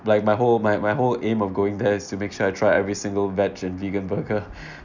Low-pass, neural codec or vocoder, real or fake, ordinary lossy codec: 7.2 kHz; none; real; none